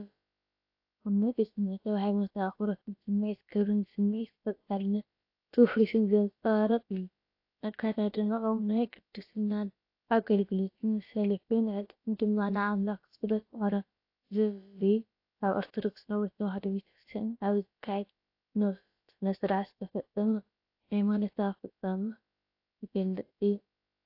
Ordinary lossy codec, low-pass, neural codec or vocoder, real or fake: AAC, 32 kbps; 5.4 kHz; codec, 16 kHz, about 1 kbps, DyCAST, with the encoder's durations; fake